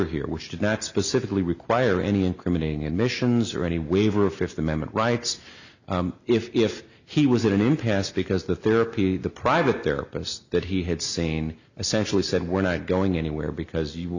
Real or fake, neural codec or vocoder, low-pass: real; none; 7.2 kHz